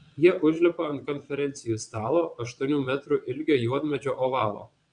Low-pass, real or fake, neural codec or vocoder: 9.9 kHz; fake; vocoder, 22.05 kHz, 80 mel bands, WaveNeXt